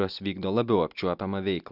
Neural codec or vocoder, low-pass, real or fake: none; 5.4 kHz; real